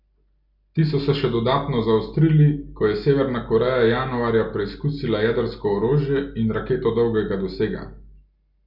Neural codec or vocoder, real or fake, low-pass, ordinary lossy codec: none; real; 5.4 kHz; none